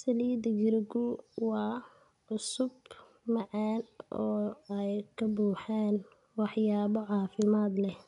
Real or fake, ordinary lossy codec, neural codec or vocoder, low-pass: real; none; none; 10.8 kHz